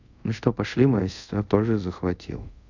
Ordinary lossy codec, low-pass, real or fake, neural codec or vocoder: none; 7.2 kHz; fake; codec, 24 kHz, 0.5 kbps, DualCodec